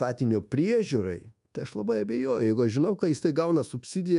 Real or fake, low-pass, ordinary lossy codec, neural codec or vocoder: fake; 10.8 kHz; MP3, 96 kbps; codec, 24 kHz, 1.2 kbps, DualCodec